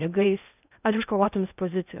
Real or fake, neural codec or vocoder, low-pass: fake; codec, 16 kHz in and 24 kHz out, 0.6 kbps, FocalCodec, streaming, 2048 codes; 3.6 kHz